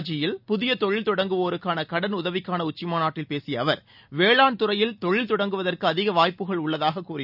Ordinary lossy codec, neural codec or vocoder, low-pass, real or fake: none; none; 5.4 kHz; real